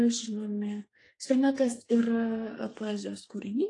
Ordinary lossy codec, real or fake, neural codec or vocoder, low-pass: AAC, 48 kbps; fake; codec, 32 kHz, 1.9 kbps, SNAC; 10.8 kHz